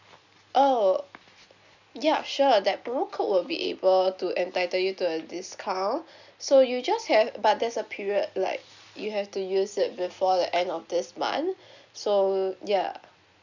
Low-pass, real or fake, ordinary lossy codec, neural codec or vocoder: 7.2 kHz; real; none; none